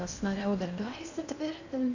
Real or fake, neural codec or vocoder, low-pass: fake; codec, 16 kHz in and 24 kHz out, 0.8 kbps, FocalCodec, streaming, 65536 codes; 7.2 kHz